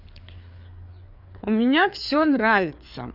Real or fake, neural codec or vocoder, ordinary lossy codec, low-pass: fake; codec, 16 kHz, 4 kbps, FreqCodec, larger model; none; 5.4 kHz